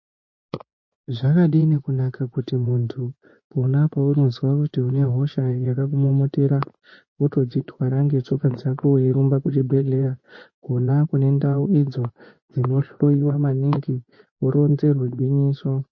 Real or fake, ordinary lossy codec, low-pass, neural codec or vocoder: fake; MP3, 32 kbps; 7.2 kHz; vocoder, 24 kHz, 100 mel bands, Vocos